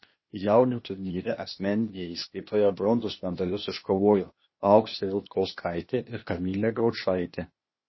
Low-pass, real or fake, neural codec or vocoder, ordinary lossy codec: 7.2 kHz; fake; codec, 16 kHz, 0.8 kbps, ZipCodec; MP3, 24 kbps